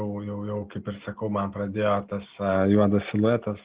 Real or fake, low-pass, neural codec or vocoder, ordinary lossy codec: real; 3.6 kHz; none; Opus, 16 kbps